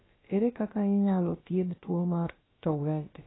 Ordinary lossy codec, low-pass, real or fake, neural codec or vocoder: AAC, 16 kbps; 7.2 kHz; fake; codec, 16 kHz, about 1 kbps, DyCAST, with the encoder's durations